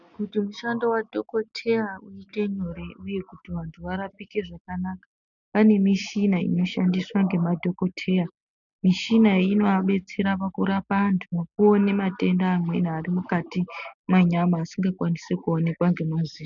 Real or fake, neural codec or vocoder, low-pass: real; none; 7.2 kHz